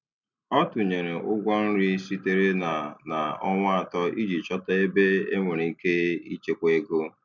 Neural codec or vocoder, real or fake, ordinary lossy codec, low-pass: none; real; none; 7.2 kHz